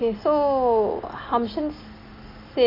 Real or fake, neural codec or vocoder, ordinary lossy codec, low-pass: real; none; AAC, 24 kbps; 5.4 kHz